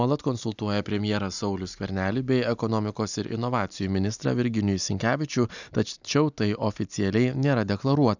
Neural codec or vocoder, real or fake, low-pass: none; real; 7.2 kHz